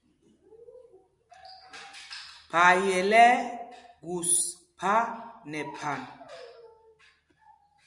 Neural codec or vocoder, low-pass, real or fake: none; 10.8 kHz; real